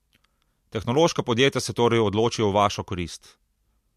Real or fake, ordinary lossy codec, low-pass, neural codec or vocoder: real; MP3, 64 kbps; 14.4 kHz; none